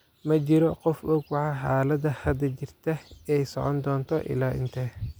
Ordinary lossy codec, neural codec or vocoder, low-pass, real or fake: none; none; none; real